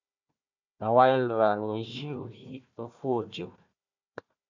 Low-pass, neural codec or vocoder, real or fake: 7.2 kHz; codec, 16 kHz, 1 kbps, FunCodec, trained on Chinese and English, 50 frames a second; fake